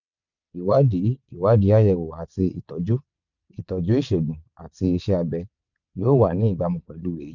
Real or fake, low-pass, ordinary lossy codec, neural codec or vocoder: fake; 7.2 kHz; none; vocoder, 22.05 kHz, 80 mel bands, WaveNeXt